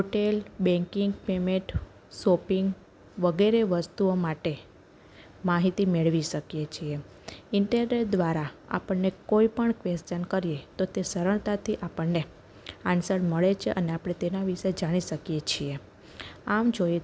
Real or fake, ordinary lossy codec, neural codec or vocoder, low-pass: real; none; none; none